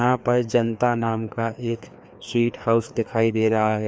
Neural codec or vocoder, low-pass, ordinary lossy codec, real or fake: codec, 16 kHz, 2 kbps, FreqCodec, larger model; none; none; fake